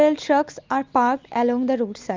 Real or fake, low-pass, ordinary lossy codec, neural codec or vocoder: real; 7.2 kHz; Opus, 32 kbps; none